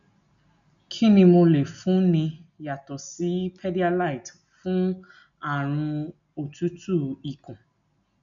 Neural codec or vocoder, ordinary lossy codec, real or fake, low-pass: none; none; real; 7.2 kHz